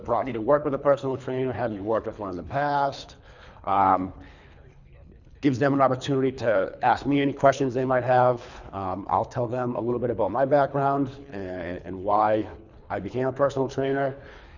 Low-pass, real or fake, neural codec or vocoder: 7.2 kHz; fake; codec, 24 kHz, 3 kbps, HILCodec